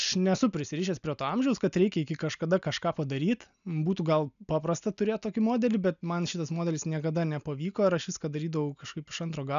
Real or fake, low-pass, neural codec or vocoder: real; 7.2 kHz; none